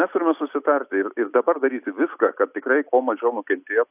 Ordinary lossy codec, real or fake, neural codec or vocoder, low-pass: AAC, 32 kbps; real; none; 3.6 kHz